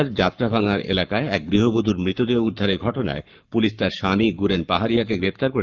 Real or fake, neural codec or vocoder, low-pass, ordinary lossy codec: fake; codec, 44.1 kHz, 7.8 kbps, Pupu-Codec; 7.2 kHz; Opus, 24 kbps